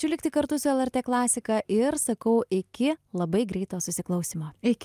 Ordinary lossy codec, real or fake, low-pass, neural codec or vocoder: Opus, 32 kbps; real; 14.4 kHz; none